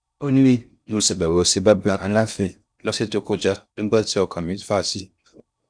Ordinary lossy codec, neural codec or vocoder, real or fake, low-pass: none; codec, 16 kHz in and 24 kHz out, 0.6 kbps, FocalCodec, streaming, 4096 codes; fake; 9.9 kHz